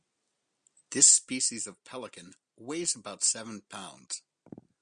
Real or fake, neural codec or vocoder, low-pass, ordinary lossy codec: real; none; 9.9 kHz; Opus, 64 kbps